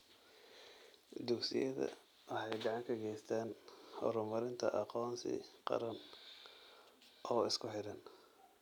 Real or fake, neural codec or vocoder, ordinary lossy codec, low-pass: real; none; none; 19.8 kHz